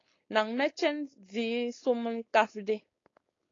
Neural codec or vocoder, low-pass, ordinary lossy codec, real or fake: codec, 16 kHz, 4.8 kbps, FACodec; 7.2 kHz; AAC, 32 kbps; fake